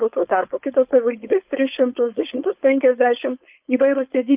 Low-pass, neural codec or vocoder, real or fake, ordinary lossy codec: 3.6 kHz; codec, 16 kHz, 4.8 kbps, FACodec; fake; Opus, 32 kbps